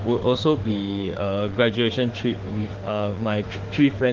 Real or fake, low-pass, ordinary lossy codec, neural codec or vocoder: fake; 7.2 kHz; Opus, 16 kbps; autoencoder, 48 kHz, 32 numbers a frame, DAC-VAE, trained on Japanese speech